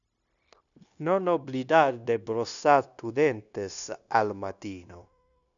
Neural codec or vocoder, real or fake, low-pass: codec, 16 kHz, 0.9 kbps, LongCat-Audio-Codec; fake; 7.2 kHz